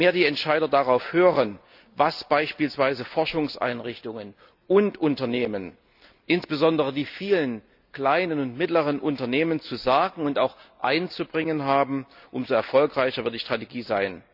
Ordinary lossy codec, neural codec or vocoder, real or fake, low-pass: none; none; real; 5.4 kHz